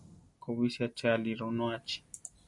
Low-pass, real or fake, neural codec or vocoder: 10.8 kHz; real; none